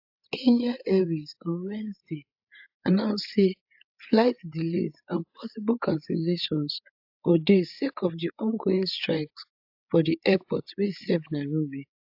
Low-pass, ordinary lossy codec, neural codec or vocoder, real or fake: 5.4 kHz; AAC, 48 kbps; codec, 16 kHz, 8 kbps, FreqCodec, larger model; fake